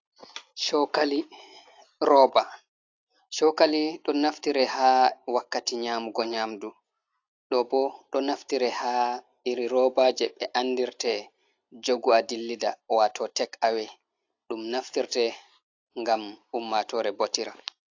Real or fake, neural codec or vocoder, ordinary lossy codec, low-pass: real; none; AAC, 48 kbps; 7.2 kHz